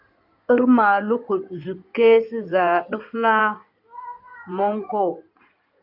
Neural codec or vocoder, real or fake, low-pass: codec, 16 kHz in and 24 kHz out, 2.2 kbps, FireRedTTS-2 codec; fake; 5.4 kHz